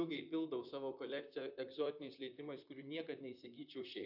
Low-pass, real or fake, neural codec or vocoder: 5.4 kHz; fake; vocoder, 44.1 kHz, 128 mel bands, Pupu-Vocoder